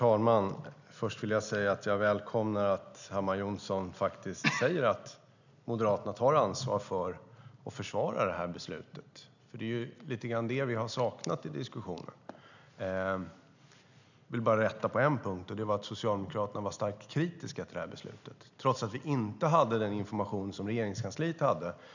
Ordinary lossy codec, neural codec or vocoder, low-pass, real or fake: none; none; 7.2 kHz; real